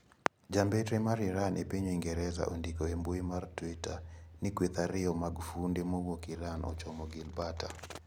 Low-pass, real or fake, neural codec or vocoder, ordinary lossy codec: none; real; none; none